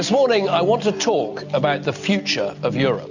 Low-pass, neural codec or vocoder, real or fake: 7.2 kHz; none; real